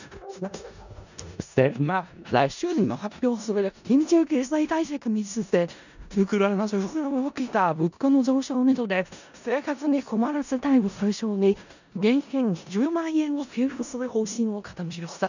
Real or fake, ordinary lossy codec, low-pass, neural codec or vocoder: fake; none; 7.2 kHz; codec, 16 kHz in and 24 kHz out, 0.4 kbps, LongCat-Audio-Codec, four codebook decoder